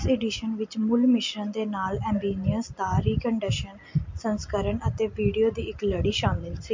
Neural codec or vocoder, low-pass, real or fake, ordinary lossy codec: none; 7.2 kHz; real; MP3, 48 kbps